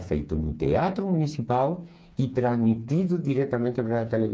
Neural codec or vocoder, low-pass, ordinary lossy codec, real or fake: codec, 16 kHz, 4 kbps, FreqCodec, smaller model; none; none; fake